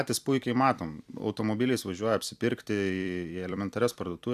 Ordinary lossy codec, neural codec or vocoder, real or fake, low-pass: AAC, 96 kbps; vocoder, 44.1 kHz, 128 mel bands every 256 samples, BigVGAN v2; fake; 14.4 kHz